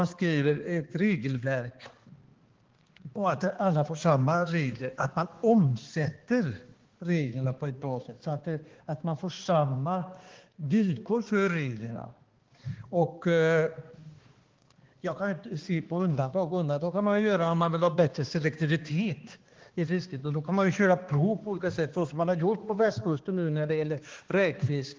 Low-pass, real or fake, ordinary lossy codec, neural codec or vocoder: 7.2 kHz; fake; Opus, 16 kbps; codec, 16 kHz, 2 kbps, X-Codec, HuBERT features, trained on balanced general audio